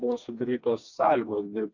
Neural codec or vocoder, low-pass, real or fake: codec, 16 kHz, 2 kbps, FreqCodec, smaller model; 7.2 kHz; fake